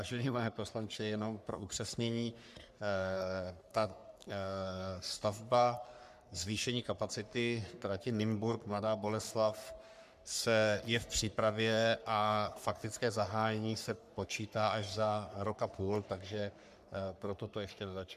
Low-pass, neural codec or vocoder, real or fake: 14.4 kHz; codec, 44.1 kHz, 3.4 kbps, Pupu-Codec; fake